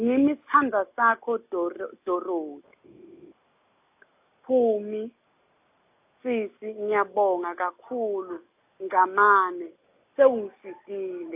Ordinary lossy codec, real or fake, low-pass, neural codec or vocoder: AAC, 32 kbps; real; 3.6 kHz; none